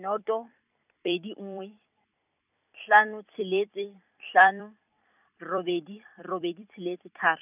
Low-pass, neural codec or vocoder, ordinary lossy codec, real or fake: 3.6 kHz; vocoder, 44.1 kHz, 128 mel bands every 256 samples, BigVGAN v2; none; fake